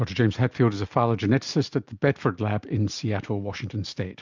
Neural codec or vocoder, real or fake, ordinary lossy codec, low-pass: none; real; MP3, 64 kbps; 7.2 kHz